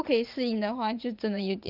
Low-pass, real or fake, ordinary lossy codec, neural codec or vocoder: 5.4 kHz; fake; Opus, 32 kbps; vocoder, 44.1 kHz, 80 mel bands, Vocos